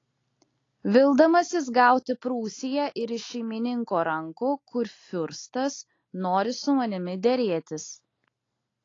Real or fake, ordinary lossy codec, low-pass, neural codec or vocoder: real; AAC, 32 kbps; 7.2 kHz; none